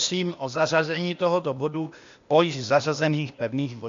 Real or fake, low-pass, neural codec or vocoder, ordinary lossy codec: fake; 7.2 kHz; codec, 16 kHz, 0.8 kbps, ZipCodec; MP3, 48 kbps